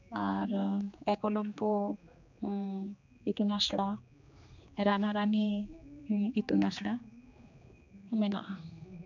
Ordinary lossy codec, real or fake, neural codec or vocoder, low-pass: none; fake; codec, 16 kHz, 2 kbps, X-Codec, HuBERT features, trained on general audio; 7.2 kHz